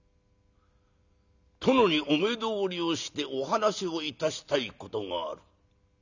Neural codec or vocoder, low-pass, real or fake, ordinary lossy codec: none; 7.2 kHz; real; none